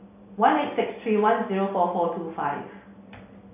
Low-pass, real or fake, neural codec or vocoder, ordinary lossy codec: 3.6 kHz; real; none; AAC, 24 kbps